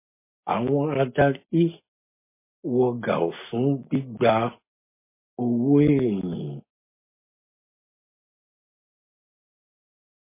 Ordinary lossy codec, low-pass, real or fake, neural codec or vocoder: MP3, 24 kbps; 3.6 kHz; real; none